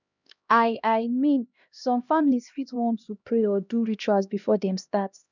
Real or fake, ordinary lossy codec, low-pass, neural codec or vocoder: fake; none; 7.2 kHz; codec, 16 kHz, 1 kbps, X-Codec, HuBERT features, trained on LibriSpeech